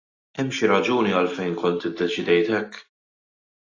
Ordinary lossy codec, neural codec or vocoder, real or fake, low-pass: AAC, 32 kbps; none; real; 7.2 kHz